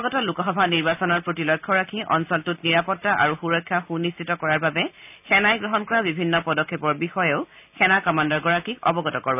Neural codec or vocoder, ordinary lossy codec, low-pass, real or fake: none; none; 3.6 kHz; real